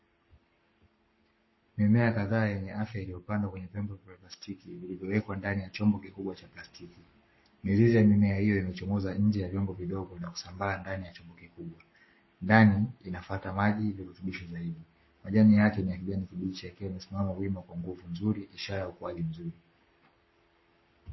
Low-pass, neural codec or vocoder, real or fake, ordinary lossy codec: 7.2 kHz; codec, 44.1 kHz, 7.8 kbps, Pupu-Codec; fake; MP3, 24 kbps